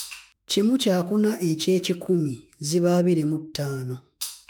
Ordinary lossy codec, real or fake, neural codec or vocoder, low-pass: none; fake; autoencoder, 48 kHz, 32 numbers a frame, DAC-VAE, trained on Japanese speech; none